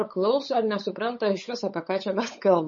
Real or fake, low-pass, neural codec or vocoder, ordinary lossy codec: fake; 7.2 kHz; codec, 16 kHz, 8 kbps, FunCodec, trained on LibriTTS, 25 frames a second; MP3, 32 kbps